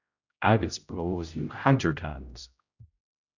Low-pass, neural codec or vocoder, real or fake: 7.2 kHz; codec, 16 kHz, 0.5 kbps, X-Codec, HuBERT features, trained on general audio; fake